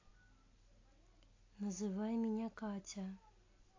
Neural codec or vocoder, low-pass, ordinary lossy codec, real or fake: none; 7.2 kHz; AAC, 32 kbps; real